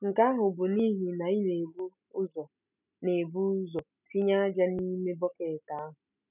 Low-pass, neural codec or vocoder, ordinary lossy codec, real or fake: 3.6 kHz; none; none; real